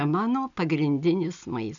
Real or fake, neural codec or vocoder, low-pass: real; none; 7.2 kHz